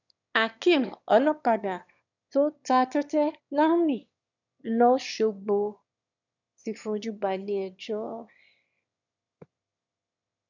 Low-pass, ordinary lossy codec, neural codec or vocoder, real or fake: 7.2 kHz; none; autoencoder, 22.05 kHz, a latent of 192 numbers a frame, VITS, trained on one speaker; fake